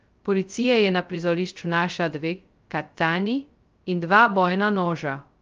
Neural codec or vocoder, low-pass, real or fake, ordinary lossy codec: codec, 16 kHz, 0.2 kbps, FocalCodec; 7.2 kHz; fake; Opus, 24 kbps